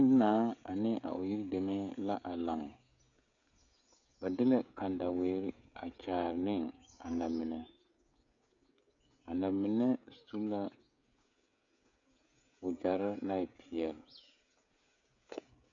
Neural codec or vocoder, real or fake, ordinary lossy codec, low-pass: codec, 16 kHz, 16 kbps, FreqCodec, smaller model; fake; MP3, 96 kbps; 7.2 kHz